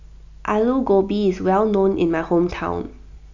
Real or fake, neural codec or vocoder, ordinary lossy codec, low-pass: real; none; none; 7.2 kHz